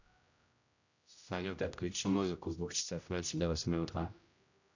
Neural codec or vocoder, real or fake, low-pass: codec, 16 kHz, 0.5 kbps, X-Codec, HuBERT features, trained on general audio; fake; 7.2 kHz